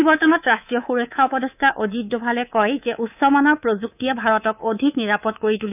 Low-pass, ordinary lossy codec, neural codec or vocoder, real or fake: 3.6 kHz; none; codec, 24 kHz, 3.1 kbps, DualCodec; fake